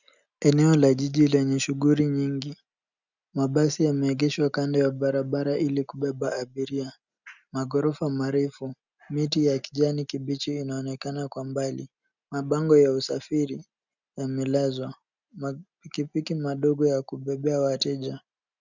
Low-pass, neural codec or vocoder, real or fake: 7.2 kHz; none; real